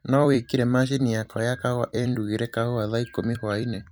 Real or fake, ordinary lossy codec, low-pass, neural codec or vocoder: fake; none; none; vocoder, 44.1 kHz, 128 mel bands every 256 samples, BigVGAN v2